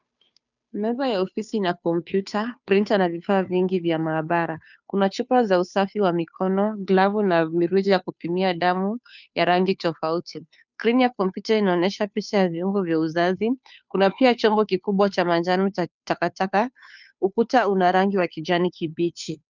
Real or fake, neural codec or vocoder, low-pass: fake; codec, 16 kHz, 2 kbps, FunCodec, trained on Chinese and English, 25 frames a second; 7.2 kHz